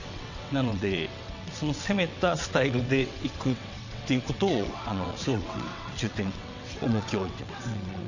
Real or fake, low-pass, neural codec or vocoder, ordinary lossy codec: fake; 7.2 kHz; vocoder, 22.05 kHz, 80 mel bands, WaveNeXt; none